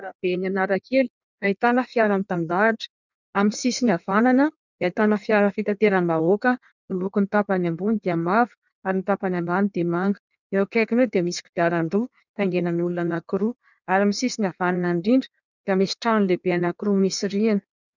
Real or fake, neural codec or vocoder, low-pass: fake; codec, 16 kHz in and 24 kHz out, 1.1 kbps, FireRedTTS-2 codec; 7.2 kHz